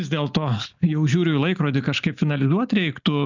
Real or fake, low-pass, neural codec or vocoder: real; 7.2 kHz; none